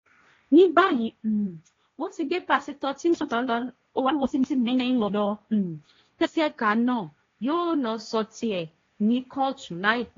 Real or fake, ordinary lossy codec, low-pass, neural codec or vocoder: fake; AAC, 32 kbps; 7.2 kHz; codec, 16 kHz, 1.1 kbps, Voila-Tokenizer